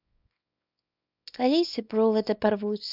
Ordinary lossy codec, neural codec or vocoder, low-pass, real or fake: none; codec, 24 kHz, 0.9 kbps, WavTokenizer, small release; 5.4 kHz; fake